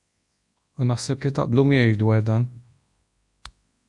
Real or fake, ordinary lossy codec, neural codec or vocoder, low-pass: fake; AAC, 64 kbps; codec, 24 kHz, 0.9 kbps, WavTokenizer, large speech release; 10.8 kHz